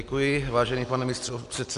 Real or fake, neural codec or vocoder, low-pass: real; none; 10.8 kHz